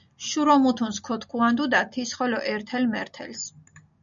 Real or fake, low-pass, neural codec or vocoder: real; 7.2 kHz; none